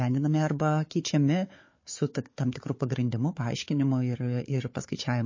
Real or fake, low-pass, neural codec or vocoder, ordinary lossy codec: fake; 7.2 kHz; codec, 16 kHz, 4 kbps, FunCodec, trained on Chinese and English, 50 frames a second; MP3, 32 kbps